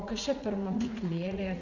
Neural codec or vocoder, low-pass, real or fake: vocoder, 44.1 kHz, 80 mel bands, Vocos; 7.2 kHz; fake